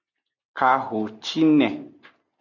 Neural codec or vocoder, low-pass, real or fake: none; 7.2 kHz; real